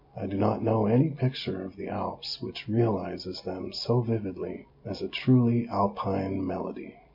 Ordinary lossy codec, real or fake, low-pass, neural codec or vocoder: MP3, 32 kbps; real; 5.4 kHz; none